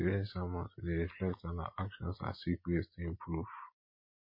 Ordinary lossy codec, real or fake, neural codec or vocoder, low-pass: MP3, 24 kbps; real; none; 5.4 kHz